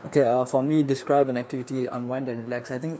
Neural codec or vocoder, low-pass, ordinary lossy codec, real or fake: codec, 16 kHz, 2 kbps, FreqCodec, larger model; none; none; fake